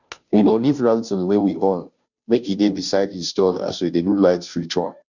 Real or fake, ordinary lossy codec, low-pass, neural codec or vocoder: fake; none; 7.2 kHz; codec, 16 kHz, 0.5 kbps, FunCodec, trained on Chinese and English, 25 frames a second